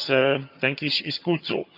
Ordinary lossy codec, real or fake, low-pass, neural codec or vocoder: none; fake; 5.4 kHz; vocoder, 22.05 kHz, 80 mel bands, HiFi-GAN